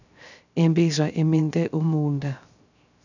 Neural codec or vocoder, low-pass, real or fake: codec, 16 kHz, 0.3 kbps, FocalCodec; 7.2 kHz; fake